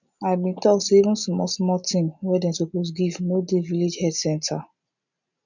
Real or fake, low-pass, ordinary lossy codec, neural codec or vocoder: real; 7.2 kHz; none; none